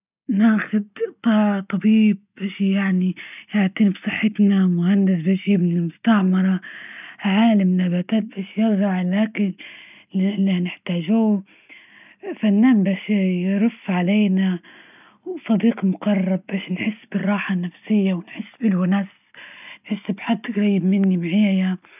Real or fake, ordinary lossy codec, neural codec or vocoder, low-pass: real; none; none; 3.6 kHz